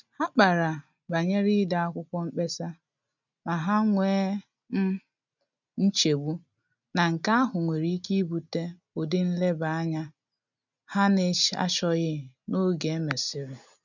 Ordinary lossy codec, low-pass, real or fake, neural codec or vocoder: none; 7.2 kHz; real; none